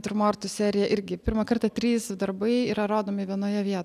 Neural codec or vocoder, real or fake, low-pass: none; real; 14.4 kHz